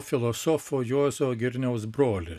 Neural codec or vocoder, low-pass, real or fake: none; 14.4 kHz; real